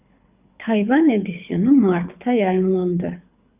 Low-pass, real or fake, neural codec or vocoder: 3.6 kHz; fake; codec, 24 kHz, 6 kbps, HILCodec